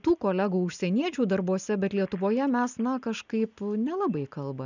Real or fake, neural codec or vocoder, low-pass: real; none; 7.2 kHz